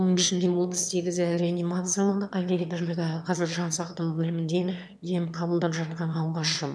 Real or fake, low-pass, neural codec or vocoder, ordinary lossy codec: fake; none; autoencoder, 22.05 kHz, a latent of 192 numbers a frame, VITS, trained on one speaker; none